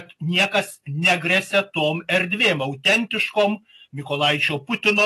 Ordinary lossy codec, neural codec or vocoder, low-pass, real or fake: AAC, 48 kbps; none; 14.4 kHz; real